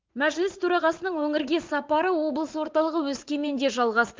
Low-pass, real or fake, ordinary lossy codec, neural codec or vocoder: 7.2 kHz; fake; Opus, 32 kbps; vocoder, 44.1 kHz, 80 mel bands, Vocos